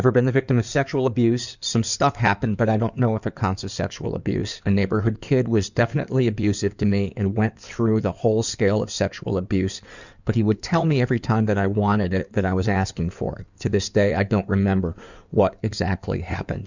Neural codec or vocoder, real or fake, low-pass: codec, 16 kHz in and 24 kHz out, 2.2 kbps, FireRedTTS-2 codec; fake; 7.2 kHz